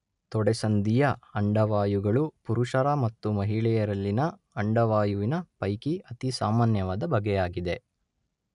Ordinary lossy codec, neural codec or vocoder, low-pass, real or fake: none; none; 10.8 kHz; real